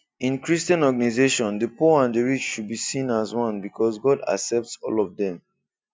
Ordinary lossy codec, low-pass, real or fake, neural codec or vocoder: none; none; real; none